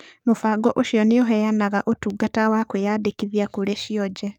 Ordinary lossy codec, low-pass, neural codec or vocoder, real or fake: none; 19.8 kHz; codec, 44.1 kHz, 7.8 kbps, DAC; fake